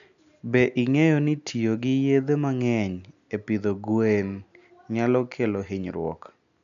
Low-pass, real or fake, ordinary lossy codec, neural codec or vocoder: 7.2 kHz; real; none; none